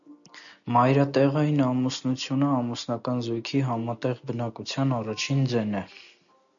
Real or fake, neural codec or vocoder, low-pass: real; none; 7.2 kHz